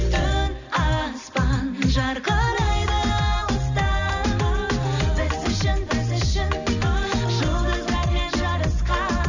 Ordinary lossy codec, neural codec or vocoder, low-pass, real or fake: none; none; 7.2 kHz; real